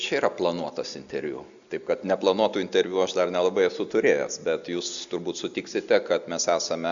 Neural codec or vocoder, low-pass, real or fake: none; 7.2 kHz; real